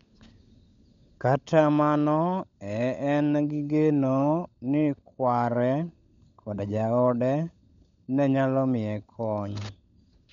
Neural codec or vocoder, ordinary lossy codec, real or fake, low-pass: codec, 16 kHz, 16 kbps, FunCodec, trained on LibriTTS, 50 frames a second; MP3, 64 kbps; fake; 7.2 kHz